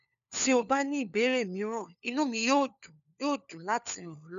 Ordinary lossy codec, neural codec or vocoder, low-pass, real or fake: none; codec, 16 kHz, 4 kbps, FunCodec, trained on LibriTTS, 50 frames a second; 7.2 kHz; fake